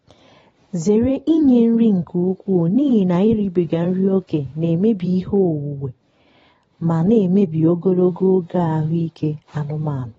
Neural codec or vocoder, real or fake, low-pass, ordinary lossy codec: vocoder, 44.1 kHz, 128 mel bands every 512 samples, BigVGAN v2; fake; 19.8 kHz; AAC, 24 kbps